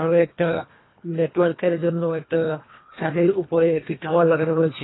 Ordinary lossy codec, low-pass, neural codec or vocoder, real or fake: AAC, 16 kbps; 7.2 kHz; codec, 24 kHz, 1.5 kbps, HILCodec; fake